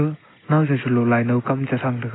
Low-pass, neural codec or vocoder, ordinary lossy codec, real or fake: 7.2 kHz; none; AAC, 16 kbps; real